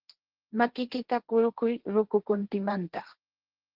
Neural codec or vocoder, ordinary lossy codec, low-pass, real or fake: codec, 16 kHz, 1.1 kbps, Voila-Tokenizer; Opus, 16 kbps; 5.4 kHz; fake